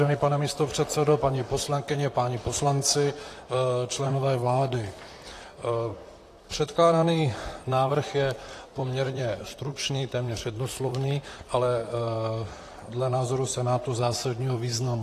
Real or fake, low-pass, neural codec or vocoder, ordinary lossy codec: fake; 14.4 kHz; vocoder, 44.1 kHz, 128 mel bands, Pupu-Vocoder; AAC, 48 kbps